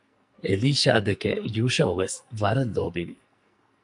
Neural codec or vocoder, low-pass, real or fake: codec, 44.1 kHz, 2.6 kbps, SNAC; 10.8 kHz; fake